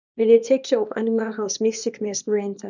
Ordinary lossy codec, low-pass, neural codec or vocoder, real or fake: none; 7.2 kHz; codec, 24 kHz, 0.9 kbps, WavTokenizer, small release; fake